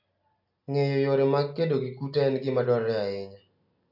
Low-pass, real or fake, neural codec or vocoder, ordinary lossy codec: 5.4 kHz; real; none; AAC, 48 kbps